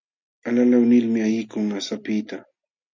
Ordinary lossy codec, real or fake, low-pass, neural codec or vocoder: MP3, 64 kbps; real; 7.2 kHz; none